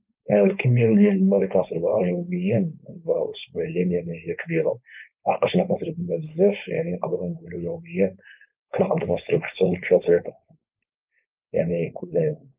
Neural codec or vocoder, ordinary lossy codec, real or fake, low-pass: codec, 16 kHz, 4.8 kbps, FACodec; Opus, 24 kbps; fake; 3.6 kHz